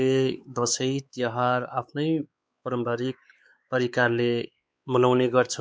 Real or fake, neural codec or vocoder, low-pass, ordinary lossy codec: fake; codec, 16 kHz, 4 kbps, X-Codec, WavLM features, trained on Multilingual LibriSpeech; none; none